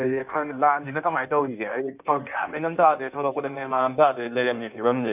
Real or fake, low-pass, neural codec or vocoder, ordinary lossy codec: fake; 3.6 kHz; codec, 16 kHz in and 24 kHz out, 1.1 kbps, FireRedTTS-2 codec; none